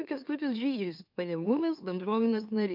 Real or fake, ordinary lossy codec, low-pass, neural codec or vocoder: fake; MP3, 48 kbps; 5.4 kHz; autoencoder, 44.1 kHz, a latent of 192 numbers a frame, MeloTTS